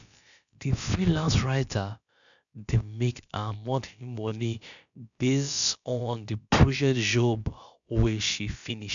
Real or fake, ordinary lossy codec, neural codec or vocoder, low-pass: fake; none; codec, 16 kHz, about 1 kbps, DyCAST, with the encoder's durations; 7.2 kHz